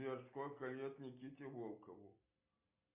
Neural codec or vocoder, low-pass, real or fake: none; 3.6 kHz; real